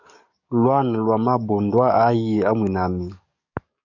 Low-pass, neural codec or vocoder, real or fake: 7.2 kHz; codec, 44.1 kHz, 7.8 kbps, DAC; fake